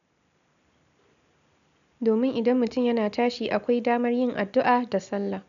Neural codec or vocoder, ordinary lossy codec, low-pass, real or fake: none; none; 7.2 kHz; real